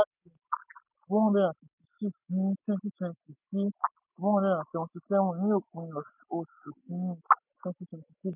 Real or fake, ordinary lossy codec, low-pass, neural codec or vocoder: real; none; 3.6 kHz; none